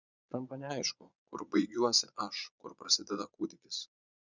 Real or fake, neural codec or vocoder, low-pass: fake; vocoder, 22.05 kHz, 80 mel bands, Vocos; 7.2 kHz